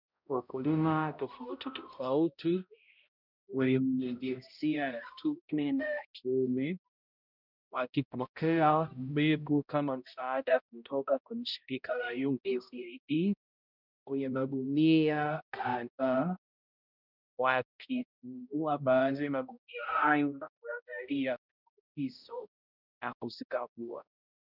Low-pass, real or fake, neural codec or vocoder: 5.4 kHz; fake; codec, 16 kHz, 0.5 kbps, X-Codec, HuBERT features, trained on balanced general audio